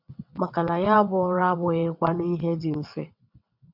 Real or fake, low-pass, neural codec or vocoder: fake; 5.4 kHz; vocoder, 22.05 kHz, 80 mel bands, WaveNeXt